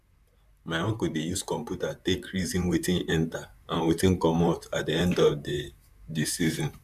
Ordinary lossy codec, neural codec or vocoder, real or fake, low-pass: none; vocoder, 44.1 kHz, 128 mel bands, Pupu-Vocoder; fake; 14.4 kHz